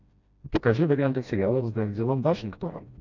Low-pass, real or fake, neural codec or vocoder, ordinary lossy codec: 7.2 kHz; fake; codec, 16 kHz, 1 kbps, FreqCodec, smaller model; MP3, 64 kbps